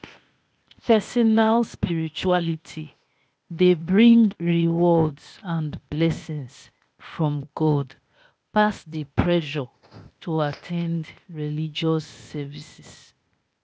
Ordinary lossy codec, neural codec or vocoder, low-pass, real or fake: none; codec, 16 kHz, 0.8 kbps, ZipCodec; none; fake